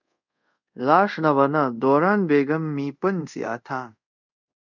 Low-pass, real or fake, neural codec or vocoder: 7.2 kHz; fake; codec, 24 kHz, 0.5 kbps, DualCodec